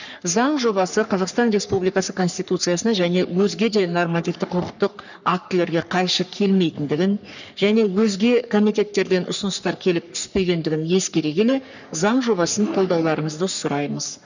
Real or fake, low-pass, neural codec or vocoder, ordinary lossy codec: fake; 7.2 kHz; codec, 44.1 kHz, 3.4 kbps, Pupu-Codec; none